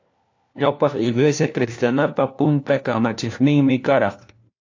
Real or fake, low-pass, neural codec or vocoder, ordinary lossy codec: fake; 7.2 kHz; codec, 16 kHz, 1 kbps, FunCodec, trained on LibriTTS, 50 frames a second; AAC, 48 kbps